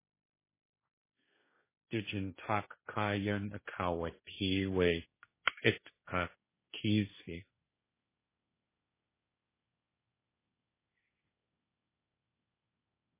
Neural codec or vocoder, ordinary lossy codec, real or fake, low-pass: codec, 16 kHz, 1.1 kbps, Voila-Tokenizer; MP3, 16 kbps; fake; 3.6 kHz